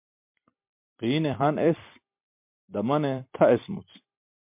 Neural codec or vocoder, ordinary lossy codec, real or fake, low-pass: vocoder, 44.1 kHz, 128 mel bands every 256 samples, BigVGAN v2; MP3, 32 kbps; fake; 3.6 kHz